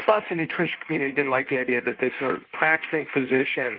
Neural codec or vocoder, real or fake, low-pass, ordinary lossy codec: codec, 16 kHz in and 24 kHz out, 1.1 kbps, FireRedTTS-2 codec; fake; 5.4 kHz; Opus, 32 kbps